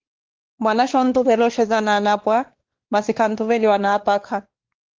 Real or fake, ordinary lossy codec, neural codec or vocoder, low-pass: fake; Opus, 16 kbps; codec, 16 kHz, 2 kbps, X-Codec, WavLM features, trained on Multilingual LibriSpeech; 7.2 kHz